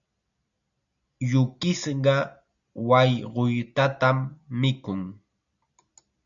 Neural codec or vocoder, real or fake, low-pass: none; real; 7.2 kHz